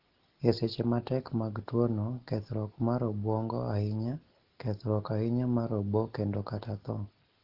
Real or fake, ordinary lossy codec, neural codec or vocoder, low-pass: real; Opus, 16 kbps; none; 5.4 kHz